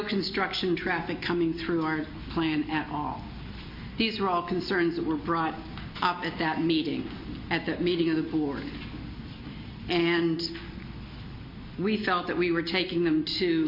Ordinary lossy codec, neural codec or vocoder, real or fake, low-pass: MP3, 32 kbps; none; real; 5.4 kHz